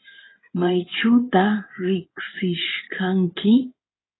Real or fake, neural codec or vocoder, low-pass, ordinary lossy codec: fake; codec, 16 kHz in and 24 kHz out, 2.2 kbps, FireRedTTS-2 codec; 7.2 kHz; AAC, 16 kbps